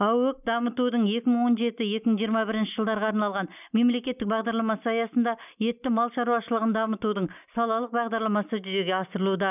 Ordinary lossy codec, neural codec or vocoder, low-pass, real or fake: none; none; 3.6 kHz; real